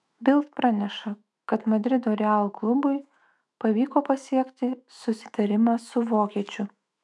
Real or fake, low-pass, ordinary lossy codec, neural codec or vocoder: fake; 10.8 kHz; MP3, 96 kbps; autoencoder, 48 kHz, 128 numbers a frame, DAC-VAE, trained on Japanese speech